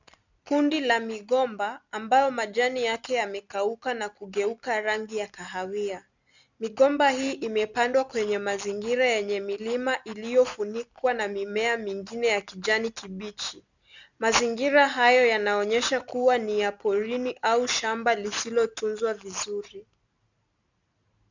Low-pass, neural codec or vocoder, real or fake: 7.2 kHz; none; real